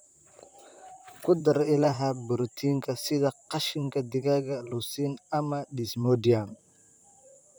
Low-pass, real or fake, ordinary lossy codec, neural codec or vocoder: none; fake; none; vocoder, 44.1 kHz, 128 mel bands, Pupu-Vocoder